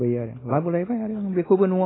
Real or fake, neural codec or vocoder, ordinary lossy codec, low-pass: real; none; AAC, 16 kbps; 7.2 kHz